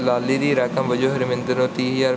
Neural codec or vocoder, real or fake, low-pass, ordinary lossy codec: none; real; none; none